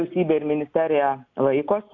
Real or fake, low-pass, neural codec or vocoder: real; 7.2 kHz; none